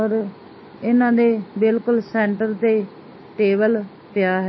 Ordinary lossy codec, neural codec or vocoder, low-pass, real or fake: MP3, 24 kbps; none; 7.2 kHz; real